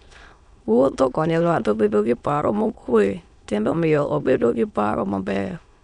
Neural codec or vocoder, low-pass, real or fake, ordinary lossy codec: autoencoder, 22.05 kHz, a latent of 192 numbers a frame, VITS, trained on many speakers; 9.9 kHz; fake; none